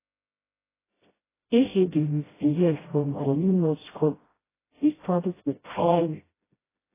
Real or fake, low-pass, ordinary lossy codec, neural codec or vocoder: fake; 3.6 kHz; AAC, 16 kbps; codec, 16 kHz, 0.5 kbps, FreqCodec, smaller model